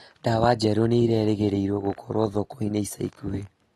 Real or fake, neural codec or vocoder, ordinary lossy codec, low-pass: real; none; AAC, 32 kbps; 14.4 kHz